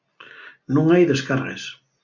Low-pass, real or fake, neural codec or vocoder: 7.2 kHz; real; none